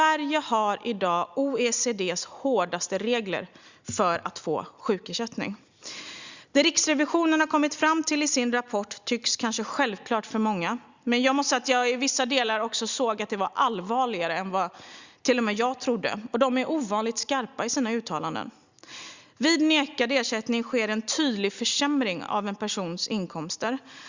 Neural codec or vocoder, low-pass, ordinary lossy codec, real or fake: none; 7.2 kHz; Opus, 64 kbps; real